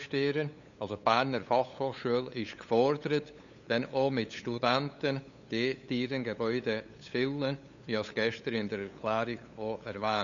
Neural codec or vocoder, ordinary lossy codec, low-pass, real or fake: codec, 16 kHz, 8 kbps, FunCodec, trained on LibriTTS, 25 frames a second; none; 7.2 kHz; fake